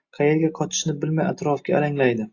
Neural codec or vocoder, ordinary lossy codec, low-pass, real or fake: none; AAC, 48 kbps; 7.2 kHz; real